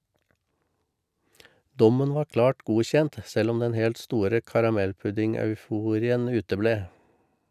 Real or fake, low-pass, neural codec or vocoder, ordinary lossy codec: real; 14.4 kHz; none; none